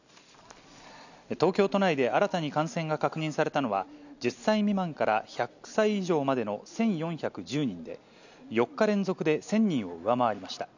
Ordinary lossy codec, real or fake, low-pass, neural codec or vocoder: none; real; 7.2 kHz; none